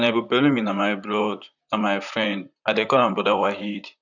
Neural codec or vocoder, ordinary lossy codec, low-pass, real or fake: vocoder, 44.1 kHz, 128 mel bands, Pupu-Vocoder; none; 7.2 kHz; fake